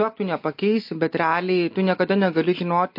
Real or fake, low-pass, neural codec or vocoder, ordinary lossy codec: real; 5.4 kHz; none; MP3, 32 kbps